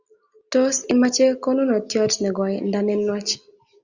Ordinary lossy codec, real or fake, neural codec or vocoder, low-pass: Opus, 64 kbps; real; none; 7.2 kHz